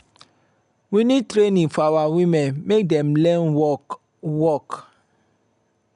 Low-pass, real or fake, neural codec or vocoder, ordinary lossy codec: 10.8 kHz; real; none; none